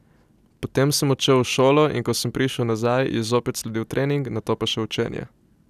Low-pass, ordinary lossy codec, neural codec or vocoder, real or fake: 14.4 kHz; none; none; real